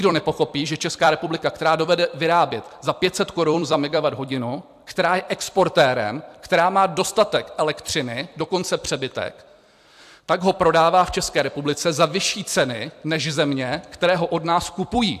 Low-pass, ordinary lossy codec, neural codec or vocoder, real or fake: 14.4 kHz; AAC, 96 kbps; vocoder, 44.1 kHz, 128 mel bands every 256 samples, BigVGAN v2; fake